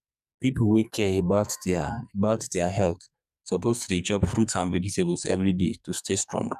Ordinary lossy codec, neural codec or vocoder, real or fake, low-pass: none; codec, 44.1 kHz, 2.6 kbps, SNAC; fake; 14.4 kHz